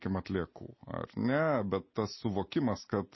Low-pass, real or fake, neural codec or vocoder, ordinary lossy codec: 7.2 kHz; real; none; MP3, 24 kbps